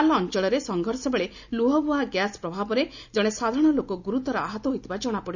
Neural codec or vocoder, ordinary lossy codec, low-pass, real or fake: none; none; 7.2 kHz; real